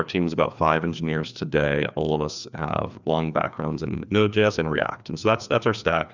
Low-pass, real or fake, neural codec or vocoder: 7.2 kHz; fake; codec, 16 kHz, 2 kbps, FreqCodec, larger model